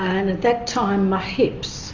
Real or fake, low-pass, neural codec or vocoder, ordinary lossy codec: real; 7.2 kHz; none; AAC, 48 kbps